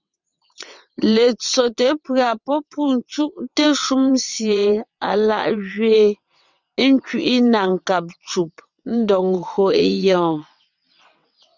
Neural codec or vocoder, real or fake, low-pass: vocoder, 22.05 kHz, 80 mel bands, WaveNeXt; fake; 7.2 kHz